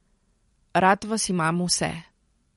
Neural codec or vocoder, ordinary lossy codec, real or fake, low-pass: vocoder, 44.1 kHz, 128 mel bands every 256 samples, BigVGAN v2; MP3, 48 kbps; fake; 19.8 kHz